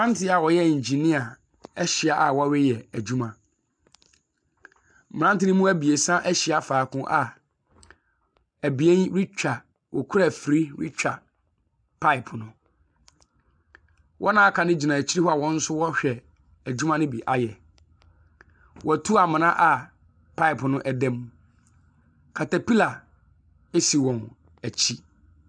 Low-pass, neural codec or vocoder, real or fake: 9.9 kHz; none; real